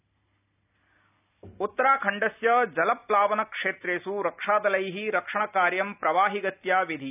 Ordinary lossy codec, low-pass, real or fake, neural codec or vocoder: none; 3.6 kHz; real; none